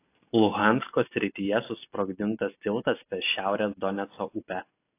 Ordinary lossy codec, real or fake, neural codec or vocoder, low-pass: AAC, 24 kbps; real; none; 3.6 kHz